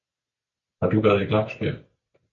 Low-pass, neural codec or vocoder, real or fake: 7.2 kHz; none; real